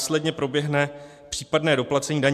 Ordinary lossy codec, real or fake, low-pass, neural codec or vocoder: AAC, 96 kbps; real; 14.4 kHz; none